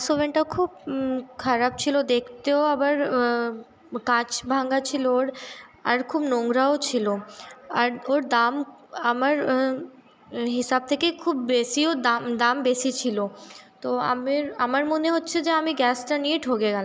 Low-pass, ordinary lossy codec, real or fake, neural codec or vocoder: none; none; real; none